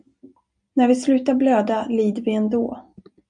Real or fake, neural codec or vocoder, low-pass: real; none; 9.9 kHz